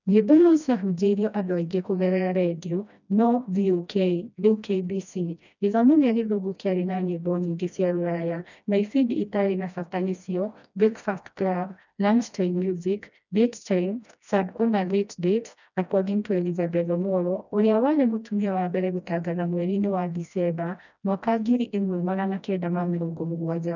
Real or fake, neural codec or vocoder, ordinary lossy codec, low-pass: fake; codec, 16 kHz, 1 kbps, FreqCodec, smaller model; none; 7.2 kHz